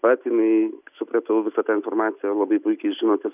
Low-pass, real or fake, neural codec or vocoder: 3.6 kHz; real; none